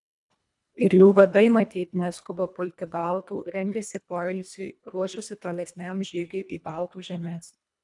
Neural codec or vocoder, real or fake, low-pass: codec, 24 kHz, 1.5 kbps, HILCodec; fake; 10.8 kHz